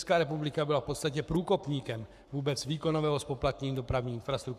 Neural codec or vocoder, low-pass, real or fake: codec, 44.1 kHz, 7.8 kbps, Pupu-Codec; 14.4 kHz; fake